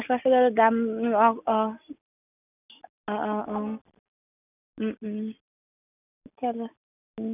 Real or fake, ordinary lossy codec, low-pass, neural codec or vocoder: real; none; 3.6 kHz; none